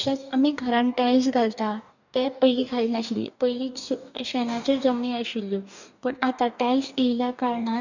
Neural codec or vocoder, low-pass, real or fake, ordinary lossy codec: codec, 44.1 kHz, 2.6 kbps, DAC; 7.2 kHz; fake; none